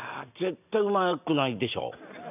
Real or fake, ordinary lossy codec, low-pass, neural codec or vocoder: real; none; 3.6 kHz; none